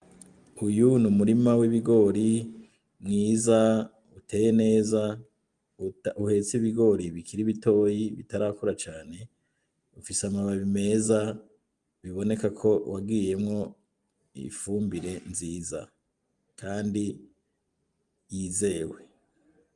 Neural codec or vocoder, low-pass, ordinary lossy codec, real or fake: none; 10.8 kHz; Opus, 32 kbps; real